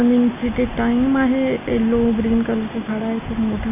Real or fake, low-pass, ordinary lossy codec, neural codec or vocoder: real; 3.6 kHz; none; none